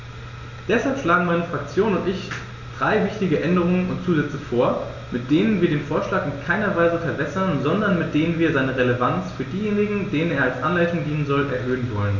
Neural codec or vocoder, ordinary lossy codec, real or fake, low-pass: none; none; real; 7.2 kHz